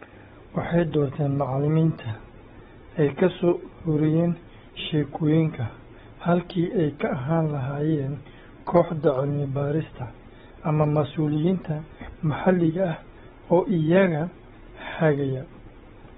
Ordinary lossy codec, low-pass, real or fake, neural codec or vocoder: AAC, 16 kbps; 7.2 kHz; fake; codec, 16 kHz, 16 kbps, FunCodec, trained on Chinese and English, 50 frames a second